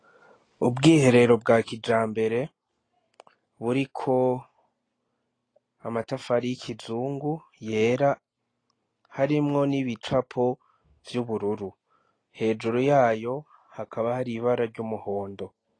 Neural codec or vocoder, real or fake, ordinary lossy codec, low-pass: none; real; AAC, 32 kbps; 9.9 kHz